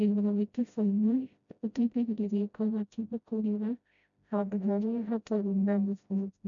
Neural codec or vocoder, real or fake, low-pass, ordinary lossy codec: codec, 16 kHz, 0.5 kbps, FreqCodec, smaller model; fake; 7.2 kHz; none